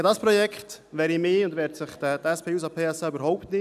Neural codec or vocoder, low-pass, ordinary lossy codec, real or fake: none; 14.4 kHz; none; real